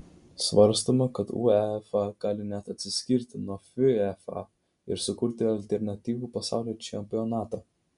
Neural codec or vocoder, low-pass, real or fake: none; 10.8 kHz; real